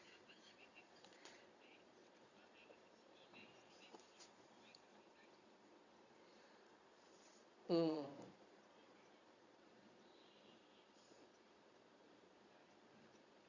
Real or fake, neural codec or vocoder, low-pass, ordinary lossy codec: fake; vocoder, 22.05 kHz, 80 mel bands, Vocos; 7.2 kHz; Opus, 64 kbps